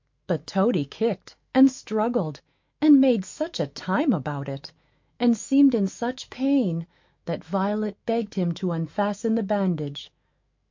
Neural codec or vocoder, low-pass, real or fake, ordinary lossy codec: autoencoder, 48 kHz, 128 numbers a frame, DAC-VAE, trained on Japanese speech; 7.2 kHz; fake; MP3, 48 kbps